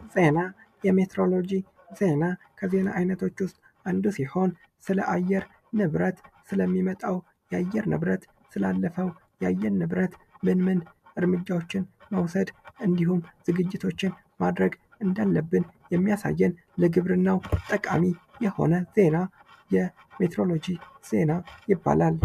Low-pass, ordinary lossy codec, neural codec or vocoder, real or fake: 14.4 kHz; MP3, 96 kbps; none; real